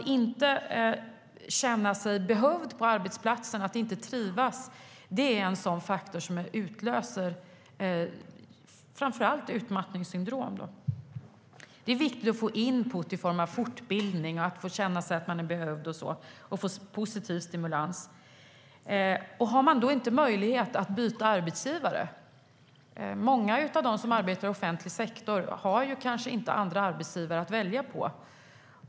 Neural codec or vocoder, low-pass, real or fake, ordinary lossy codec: none; none; real; none